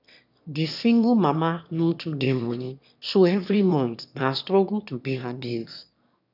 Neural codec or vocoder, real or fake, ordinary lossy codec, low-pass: autoencoder, 22.05 kHz, a latent of 192 numbers a frame, VITS, trained on one speaker; fake; none; 5.4 kHz